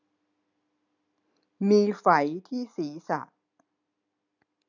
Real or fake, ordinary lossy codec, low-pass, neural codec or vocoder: real; none; 7.2 kHz; none